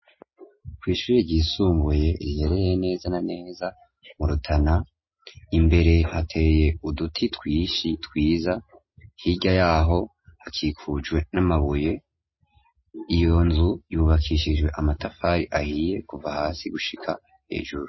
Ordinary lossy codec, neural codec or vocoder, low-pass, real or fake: MP3, 24 kbps; none; 7.2 kHz; real